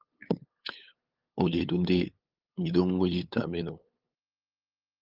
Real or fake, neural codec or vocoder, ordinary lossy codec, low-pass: fake; codec, 16 kHz, 8 kbps, FunCodec, trained on LibriTTS, 25 frames a second; Opus, 32 kbps; 5.4 kHz